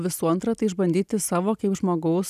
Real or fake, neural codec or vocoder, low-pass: real; none; 14.4 kHz